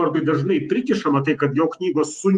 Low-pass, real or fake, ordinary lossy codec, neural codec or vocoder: 10.8 kHz; fake; Opus, 32 kbps; vocoder, 48 kHz, 128 mel bands, Vocos